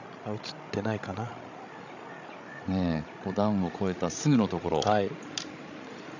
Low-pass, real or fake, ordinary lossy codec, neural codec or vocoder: 7.2 kHz; fake; none; codec, 16 kHz, 16 kbps, FreqCodec, larger model